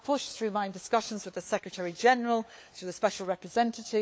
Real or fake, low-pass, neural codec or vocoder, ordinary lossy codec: fake; none; codec, 16 kHz, 4 kbps, FunCodec, trained on LibriTTS, 50 frames a second; none